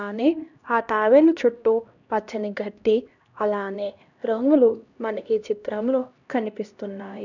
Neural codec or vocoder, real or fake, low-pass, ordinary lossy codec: codec, 16 kHz, 1 kbps, X-Codec, HuBERT features, trained on LibriSpeech; fake; 7.2 kHz; none